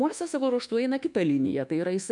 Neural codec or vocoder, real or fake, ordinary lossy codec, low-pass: codec, 24 kHz, 1.2 kbps, DualCodec; fake; MP3, 96 kbps; 10.8 kHz